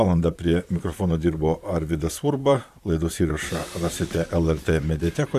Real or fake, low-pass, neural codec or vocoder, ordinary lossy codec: fake; 14.4 kHz; vocoder, 44.1 kHz, 128 mel bands, Pupu-Vocoder; AAC, 96 kbps